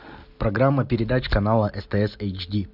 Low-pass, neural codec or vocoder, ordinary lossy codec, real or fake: 5.4 kHz; none; AAC, 48 kbps; real